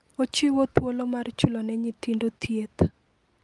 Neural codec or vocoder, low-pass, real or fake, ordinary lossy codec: none; 10.8 kHz; real; Opus, 32 kbps